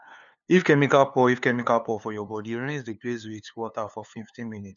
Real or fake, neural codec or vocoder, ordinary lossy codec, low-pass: fake; codec, 16 kHz, 2 kbps, FunCodec, trained on LibriTTS, 25 frames a second; none; 7.2 kHz